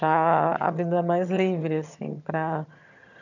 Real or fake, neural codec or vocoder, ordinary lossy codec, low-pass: fake; vocoder, 22.05 kHz, 80 mel bands, HiFi-GAN; none; 7.2 kHz